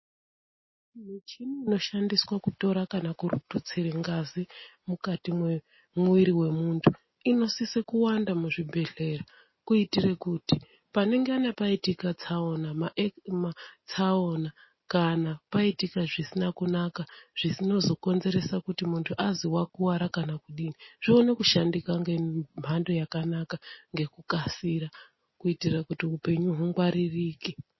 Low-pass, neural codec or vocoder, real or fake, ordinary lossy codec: 7.2 kHz; none; real; MP3, 24 kbps